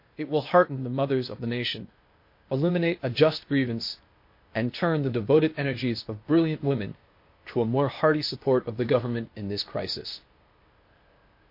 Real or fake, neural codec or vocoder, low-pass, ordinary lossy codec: fake; codec, 16 kHz, 0.8 kbps, ZipCodec; 5.4 kHz; MP3, 32 kbps